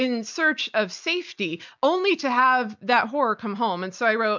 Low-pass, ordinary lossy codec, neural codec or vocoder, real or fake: 7.2 kHz; MP3, 64 kbps; none; real